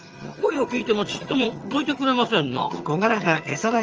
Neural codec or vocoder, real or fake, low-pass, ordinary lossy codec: vocoder, 22.05 kHz, 80 mel bands, HiFi-GAN; fake; 7.2 kHz; Opus, 24 kbps